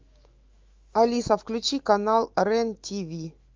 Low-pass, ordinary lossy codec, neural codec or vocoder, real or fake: 7.2 kHz; Opus, 32 kbps; autoencoder, 48 kHz, 128 numbers a frame, DAC-VAE, trained on Japanese speech; fake